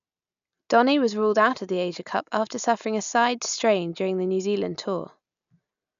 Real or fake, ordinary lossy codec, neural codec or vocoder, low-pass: real; none; none; 7.2 kHz